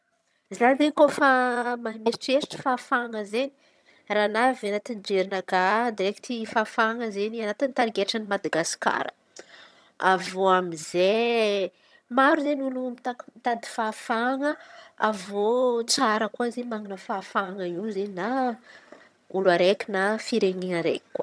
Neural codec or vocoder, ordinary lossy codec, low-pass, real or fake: vocoder, 22.05 kHz, 80 mel bands, HiFi-GAN; none; none; fake